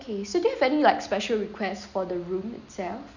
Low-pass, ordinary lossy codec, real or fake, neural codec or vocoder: 7.2 kHz; none; real; none